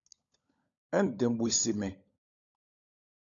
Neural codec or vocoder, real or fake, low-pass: codec, 16 kHz, 16 kbps, FunCodec, trained on LibriTTS, 50 frames a second; fake; 7.2 kHz